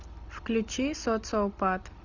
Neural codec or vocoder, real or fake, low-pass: none; real; 7.2 kHz